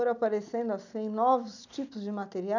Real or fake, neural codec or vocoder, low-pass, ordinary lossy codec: real; none; 7.2 kHz; none